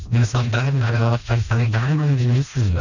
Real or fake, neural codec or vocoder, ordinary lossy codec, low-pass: fake; codec, 16 kHz, 1 kbps, FreqCodec, smaller model; none; 7.2 kHz